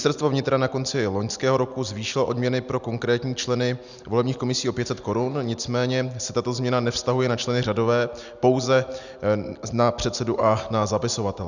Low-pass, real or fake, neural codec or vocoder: 7.2 kHz; real; none